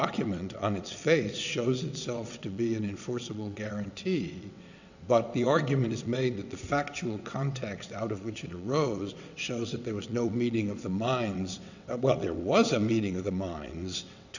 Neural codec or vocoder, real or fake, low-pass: vocoder, 22.05 kHz, 80 mel bands, WaveNeXt; fake; 7.2 kHz